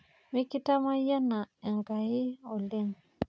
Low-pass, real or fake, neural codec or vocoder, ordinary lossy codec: none; real; none; none